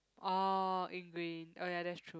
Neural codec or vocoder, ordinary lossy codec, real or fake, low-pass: none; none; real; none